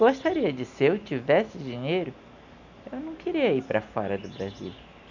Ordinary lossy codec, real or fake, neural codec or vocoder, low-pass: none; real; none; 7.2 kHz